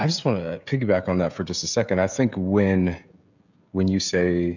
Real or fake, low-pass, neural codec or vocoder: fake; 7.2 kHz; codec, 16 kHz, 16 kbps, FreqCodec, smaller model